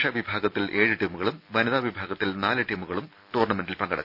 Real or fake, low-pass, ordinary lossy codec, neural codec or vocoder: real; 5.4 kHz; none; none